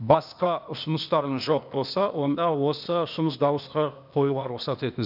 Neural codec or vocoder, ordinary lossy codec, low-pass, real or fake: codec, 16 kHz, 0.8 kbps, ZipCodec; none; 5.4 kHz; fake